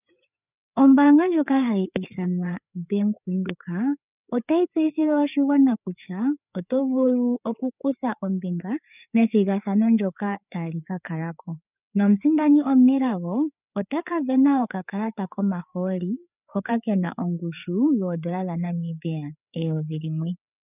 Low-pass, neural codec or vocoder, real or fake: 3.6 kHz; codec, 16 kHz, 4 kbps, FreqCodec, larger model; fake